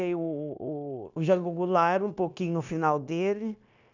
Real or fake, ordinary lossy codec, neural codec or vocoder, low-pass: fake; none; codec, 16 kHz, 0.9 kbps, LongCat-Audio-Codec; 7.2 kHz